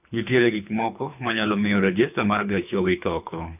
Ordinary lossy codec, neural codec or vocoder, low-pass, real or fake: none; codec, 24 kHz, 3 kbps, HILCodec; 3.6 kHz; fake